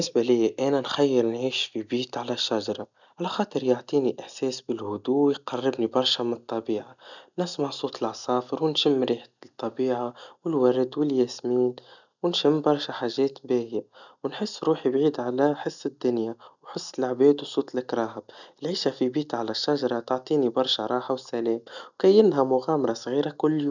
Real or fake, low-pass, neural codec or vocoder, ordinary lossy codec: real; 7.2 kHz; none; none